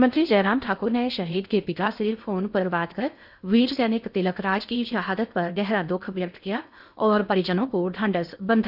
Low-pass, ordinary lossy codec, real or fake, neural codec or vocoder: 5.4 kHz; none; fake; codec, 16 kHz in and 24 kHz out, 0.8 kbps, FocalCodec, streaming, 65536 codes